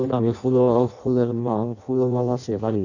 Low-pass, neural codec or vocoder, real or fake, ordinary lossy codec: 7.2 kHz; codec, 16 kHz in and 24 kHz out, 0.6 kbps, FireRedTTS-2 codec; fake; none